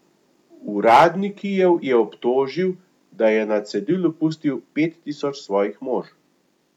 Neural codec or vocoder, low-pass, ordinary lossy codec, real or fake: none; 19.8 kHz; none; real